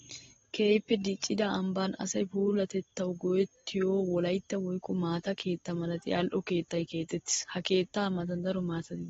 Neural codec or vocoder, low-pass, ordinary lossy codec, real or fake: none; 7.2 kHz; AAC, 24 kbps; real